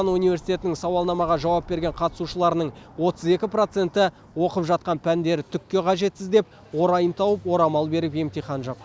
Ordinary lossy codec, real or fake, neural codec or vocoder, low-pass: none; real; none; none